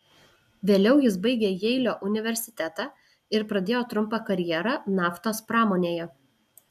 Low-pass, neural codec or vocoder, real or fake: 14.4 kHz; none; real